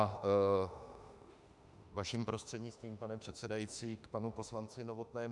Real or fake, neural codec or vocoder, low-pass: fake; autoencoder, 48 kHz, 32 numbers a frame, DAC-VAE, trained on Japanese speech; 10.8 kHz